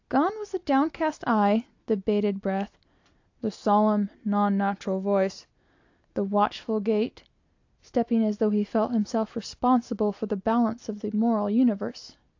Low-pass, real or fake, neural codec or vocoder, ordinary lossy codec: 7.2 kHz; real; none; AAC, 48 kbps